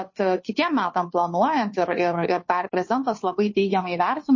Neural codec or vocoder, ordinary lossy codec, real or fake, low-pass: codec, 16 kHz, 2 kbps, FunCodec, trained on Chinese and English, 25 frames a second; MP3, 32 kbps; fake; 7.2 kHz